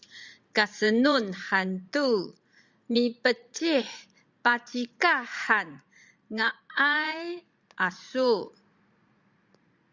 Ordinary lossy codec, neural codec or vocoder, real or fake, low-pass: Opus, 64 kbps; vocoder, 44.1 kHz, 80 mel bands, Vocos; fake; 7.2 kHz